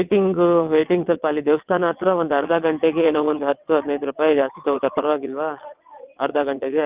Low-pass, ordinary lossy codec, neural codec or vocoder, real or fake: 3.6 kHz; Opus, 24 kbps; vocoder, 22.05 kHz, 80 mel bands, WaveNeXt; fake